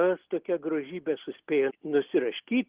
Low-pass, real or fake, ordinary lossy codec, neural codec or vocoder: 3.6 kHz; real; Opus, 16 kbps; none